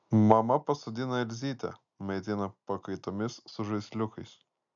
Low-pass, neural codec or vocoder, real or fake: 7.2 kHz; none; real